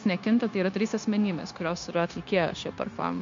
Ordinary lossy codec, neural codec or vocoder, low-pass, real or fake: MP3, 48 kbps; codec, 16 kHz, 0.9 kbps, LongCat-Audio-Codec; 7.2 kHz; fake